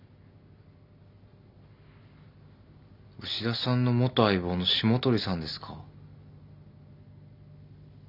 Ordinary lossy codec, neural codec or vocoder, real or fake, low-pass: none; none; real; 5.4 kHz